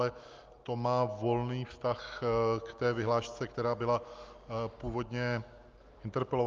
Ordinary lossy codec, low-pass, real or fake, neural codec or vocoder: Opus, 32 kbps; 7.2 kHz; real; none